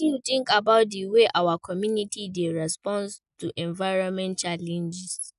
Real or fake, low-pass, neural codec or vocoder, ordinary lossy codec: real; 10.8 kHz; none; none